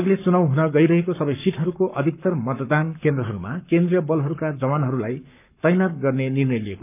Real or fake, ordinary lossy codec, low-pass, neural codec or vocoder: fake; none; 3.6 kHz; codec, 44.1 kHz, 7.8 kbps, Pupu-Codec